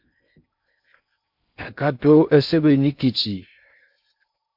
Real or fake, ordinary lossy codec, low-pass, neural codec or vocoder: fake; MP3, 48 kbps; 5.4 kHz; codec, 16 kHz in and 24 kHz out, 0.6 kbps, FocalCodec, streaming, 2048 codes